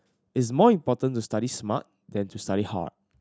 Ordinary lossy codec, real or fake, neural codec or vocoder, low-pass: none; real; none; none